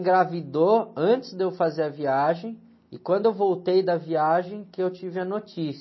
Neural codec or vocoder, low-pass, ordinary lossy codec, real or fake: none; 7.2 kHz; MP3, 24 kbps; real